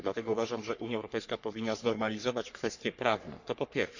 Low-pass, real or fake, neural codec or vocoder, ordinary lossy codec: 7.2 kHz; fake; codec, 44.1 kHz, 3.4 kbps, Pupu-Codec; none